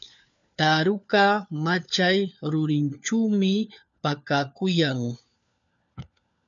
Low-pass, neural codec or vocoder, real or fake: 7.2 kHz; codec, 16 kHz, 4 kbps, FunCodec, trained on LibriTTS, 50 frames a second; fake